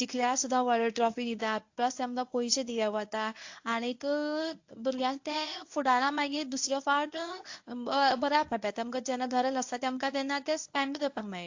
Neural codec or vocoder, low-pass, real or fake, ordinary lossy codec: codec, 24 kHz, 0.9 kbps, WavTokenizer, medium speech release version 1; 7.2 kHz; fake; AAC, 48 kbps